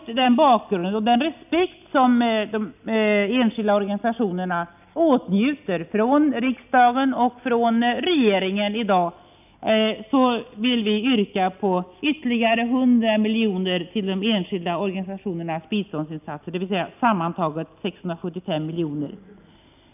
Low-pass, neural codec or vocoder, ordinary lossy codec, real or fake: 3.6 kHz; none; none; real